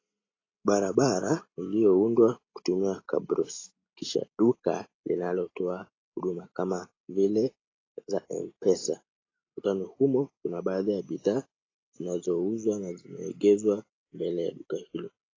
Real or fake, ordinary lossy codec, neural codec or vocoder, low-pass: real; AAC, 32 kbps; none; 7.2 kHz